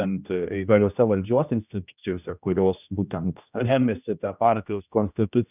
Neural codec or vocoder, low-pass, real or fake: codec, 16 kHz, 1 kbps, X-Codec, HuBERT features, trained on general audio; 3.6 kHz; fake